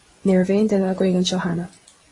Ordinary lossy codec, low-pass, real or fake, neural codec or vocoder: AAC, 32 kbps; 10.8 kHz; fake; vocoder, 24 kHz, 100 mel bands, Vocos